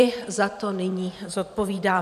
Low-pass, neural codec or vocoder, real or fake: 14.4 kHz; vocoder, 44.1 kHz, 128 mel bands every 512 samples, BigVGAN v2; fake